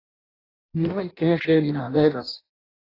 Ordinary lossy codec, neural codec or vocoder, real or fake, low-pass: AAC, 24 kbps; codec, 16 kHz in and 24 kHz out, 0.6 kbps, FireRedTTS-2 codec; fake; 5.4 kHz